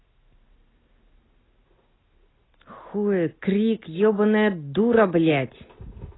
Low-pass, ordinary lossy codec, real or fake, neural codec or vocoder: 7.2 kHz; AAC, 16 kbps; real; none